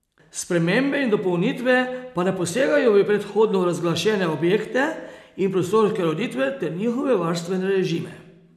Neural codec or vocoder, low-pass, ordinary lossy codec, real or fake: none; 14.4 kHz; none; real